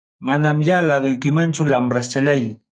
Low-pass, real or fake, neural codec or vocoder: 9.9 kHz; fake; codec, 44.1 kHz, 2.6 kbps, SNAC